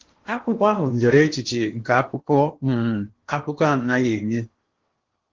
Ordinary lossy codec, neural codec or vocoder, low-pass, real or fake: Opus, 16 kbps; codec, 16 kHz in and 24 kHz out, 0.8 kbps, FocalCodec, streaming, 65536 codes; 7.2 kHz; fake